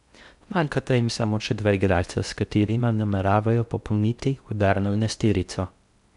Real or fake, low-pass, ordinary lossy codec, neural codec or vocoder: fake; 10.8 kHz; none; codec, 16 kHz in and 24 kHz out, 0.6 kbps, FocalCodec, streaming, 2048 codes